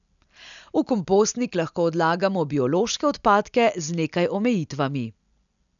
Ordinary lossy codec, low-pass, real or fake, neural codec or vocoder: none; 7.2 kHz; real; none